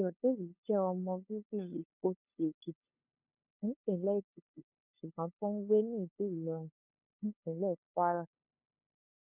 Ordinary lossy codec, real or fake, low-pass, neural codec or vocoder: none; fake; 3.6 kHz; codec, 16 kHz, 2 kbps, FunCodec, trained on LibriTTS, 25 frames a second